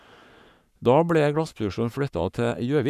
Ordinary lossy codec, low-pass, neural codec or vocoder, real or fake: none; 14.4 kHz; codec, 44.1 kHz, 7.8 kbps, Pupu-Codec; fake